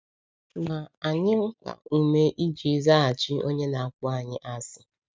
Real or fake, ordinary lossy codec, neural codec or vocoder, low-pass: real; none; none; none